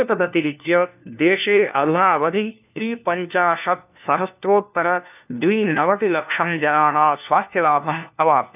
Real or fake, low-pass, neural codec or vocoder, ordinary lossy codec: fake; 3.6 kHz; codec, 16 kHz, 1 kbps, FunCodec, trained on LibriTTS, 50 frames a second; none